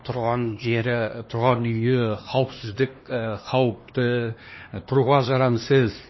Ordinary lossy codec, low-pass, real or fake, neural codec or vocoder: MP3, 24 kbps; 7.2 kHz; fake; codec, 16 kHz, 2 kbps, X-Codec, HuBERT features, trained on LibriSpeech